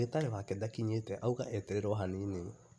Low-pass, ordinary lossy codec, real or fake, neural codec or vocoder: none; none; real; none